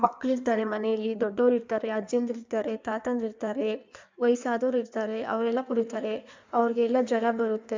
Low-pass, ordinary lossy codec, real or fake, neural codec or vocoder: 7.2 kHz; MP3, 64 kbps; fake; codec, 16 kHz in and 24 kHz out, 1.1 kbps, FireRedTTS-2 codec